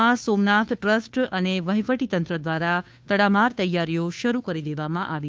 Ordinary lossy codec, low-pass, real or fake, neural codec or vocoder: none; none; fake; codec, 16 kHz, 2 kbps, FunCodec, trained on Chinese and English, 25 frames a second